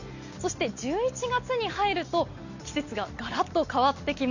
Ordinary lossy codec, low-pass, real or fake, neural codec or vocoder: none; 7.2 kHz; real; none